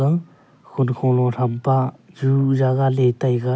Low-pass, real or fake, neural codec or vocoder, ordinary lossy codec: none; real; none; none